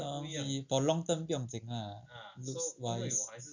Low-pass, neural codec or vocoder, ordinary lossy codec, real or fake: 7.2 kHz; none; none; real